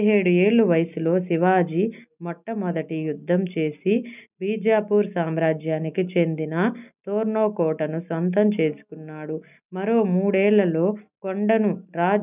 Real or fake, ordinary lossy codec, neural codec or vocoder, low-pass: real; none; none; 3.6 kHz